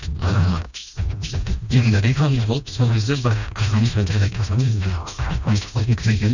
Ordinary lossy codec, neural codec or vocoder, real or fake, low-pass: none; codec, 16 kHz, 1 kbps, FreqCodec, smaller model; fake; 7.2 kHz